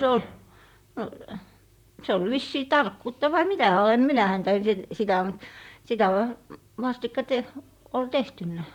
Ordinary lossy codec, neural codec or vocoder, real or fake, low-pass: none; vocoder, 44.1 kHz, 128 mel bands, Pupu-Vocoder; fake; 19.8 kHz